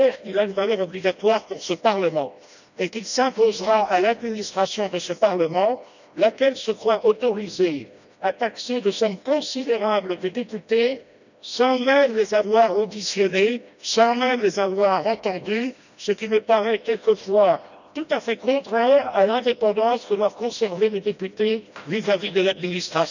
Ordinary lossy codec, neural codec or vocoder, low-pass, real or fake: none; codec, 16 kHz, 1 kbps, FreqCodec, smaller model; 7.2 kHz; fake